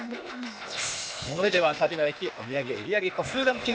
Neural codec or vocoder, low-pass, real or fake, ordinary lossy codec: codec, 16 kHz, 0.8 kbps, ZipCodec; none; fake; none